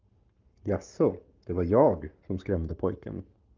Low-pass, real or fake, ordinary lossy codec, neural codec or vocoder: 7.2 kHz; fake; Opus, 32 kbps; codec, 44.1 kHz, 7.8 kbps, Pupu-Codec